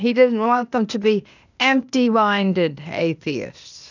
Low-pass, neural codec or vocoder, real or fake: 7.2 kHz; codec, 16 kHz, 0.8 kbps, ZipCodec; fake